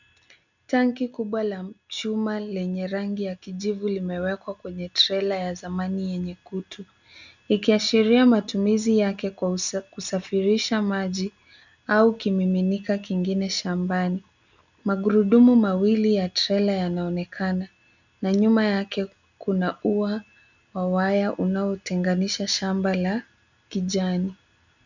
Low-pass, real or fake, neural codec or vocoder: 7.2 kHz; real; none